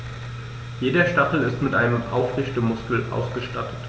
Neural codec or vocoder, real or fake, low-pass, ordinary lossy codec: none; real; none; none